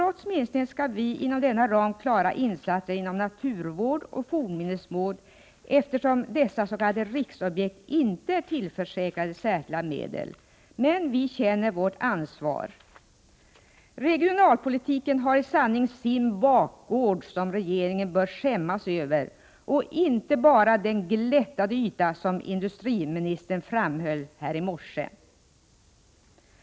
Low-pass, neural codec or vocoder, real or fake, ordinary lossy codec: none; none; real; none